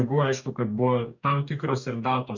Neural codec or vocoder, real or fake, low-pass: codec, 44.1 kHz, 2.6 kbps, DAC; fake; 7.2 kHz